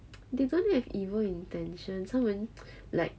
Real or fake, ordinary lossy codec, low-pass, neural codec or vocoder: real; none; none; none